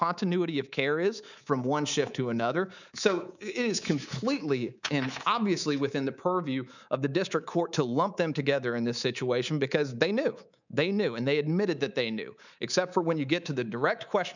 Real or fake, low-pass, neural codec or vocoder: fake; 7.2 kHz; codec, 24 kHz, 3.1 kbps, DualCodec